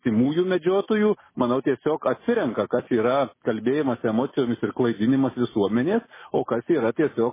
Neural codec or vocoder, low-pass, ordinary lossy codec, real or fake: none; 3.6 kHz; MP3, 16 kbps; real